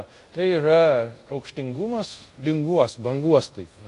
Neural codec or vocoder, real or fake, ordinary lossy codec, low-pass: codec, 24 kHz, 0.5 kbps, DualCodec; fake; AAC, 48 kbps; 10.8 kHz